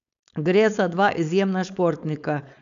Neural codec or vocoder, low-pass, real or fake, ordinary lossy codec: codec, 16 kHz, 4.8 kbps, FACodec; 7.2 kHz; fake; none